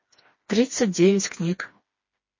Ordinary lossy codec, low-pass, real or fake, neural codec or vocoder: MP3, 32 kbps; 7.2 kHz; fake; codec, 16 kHz, 2 kbps, FreqCodec, smaller model